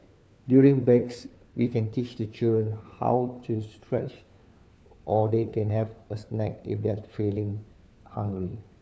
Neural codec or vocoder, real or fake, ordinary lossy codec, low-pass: codec, 16 kHz, 4 kbps, FunCodec, trained on LibriTTS, 50 frames a second; fake; none; none